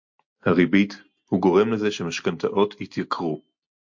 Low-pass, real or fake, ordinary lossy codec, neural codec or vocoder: 7.2 kHz; real; MP3, 48 kbps; none